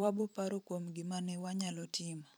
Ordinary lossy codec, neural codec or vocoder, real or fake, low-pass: none; vocoder, 44.1 kHz, 128 mel bands every 512 samples, BigVGAN v2; fake; none